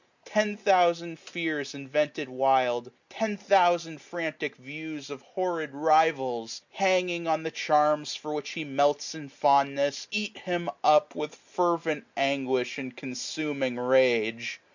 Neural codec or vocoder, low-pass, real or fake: none; 7.2 kHz; real